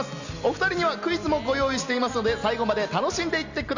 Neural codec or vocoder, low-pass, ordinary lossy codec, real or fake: none; 7.2 kHz; none; real